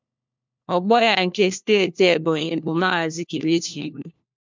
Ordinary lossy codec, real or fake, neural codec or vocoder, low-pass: MP3, 64 kbps; fake; codec, 16 kHz, 1 kbps, FunCodec, trained on LibriTTS, 50 frames a second; 7.2 kHz